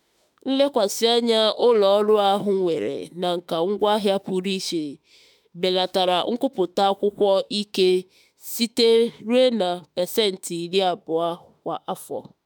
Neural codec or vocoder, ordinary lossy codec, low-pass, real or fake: autoencoder, 48 kHz, 32 numbers a frame, DAC-VAE, trained on Japanese speech; none; none; fake